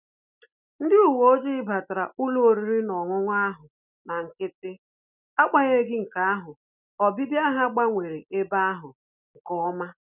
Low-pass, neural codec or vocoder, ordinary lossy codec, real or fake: 3.6 kHz; none; none; real